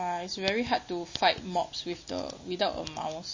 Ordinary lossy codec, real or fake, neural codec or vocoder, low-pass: MP3, 32 kbps; real; none; 7.2 kHz